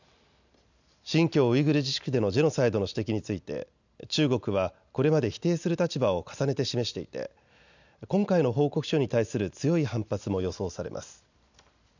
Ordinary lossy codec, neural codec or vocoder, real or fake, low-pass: none; none; real; 7.2 kHz